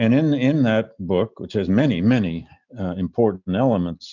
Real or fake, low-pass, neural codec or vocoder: real; 7.2 kHz; none